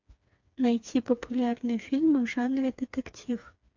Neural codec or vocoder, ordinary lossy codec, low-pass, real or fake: codec, 16 kHz, 4 kbps, FreqCodec, smaller model; MP3, 64 kbps; 7.2 kHz; fake